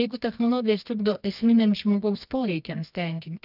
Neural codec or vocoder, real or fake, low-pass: codec, 24 kHz, 0.9 kbps, WavTokenizer, medium music audio release; fake; 5.4 kHz